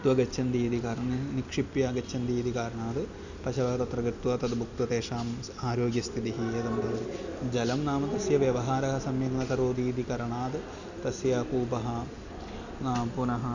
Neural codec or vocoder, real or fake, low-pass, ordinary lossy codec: none; real; 7.2 kHz; none